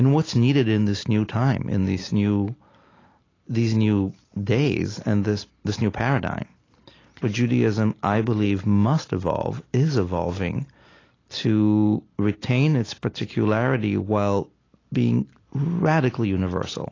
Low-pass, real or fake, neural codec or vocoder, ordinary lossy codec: 7.2 kHz; real; none; AAC, 32 kbps